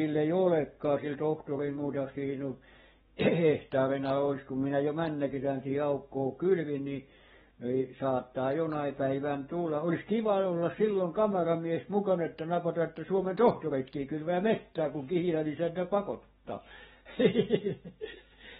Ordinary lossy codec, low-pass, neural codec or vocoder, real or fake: AAC, 16 kbps; 19.8 kHz; codec, 44.1 kHz, 7.8 kbps, DAC; fake